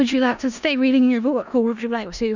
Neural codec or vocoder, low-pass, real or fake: codec, 16 kHz in and 24 kHz out, 0.4 kbps, LongCat-Audio-Codec, four codebook decoder; 7.2 kHz; fake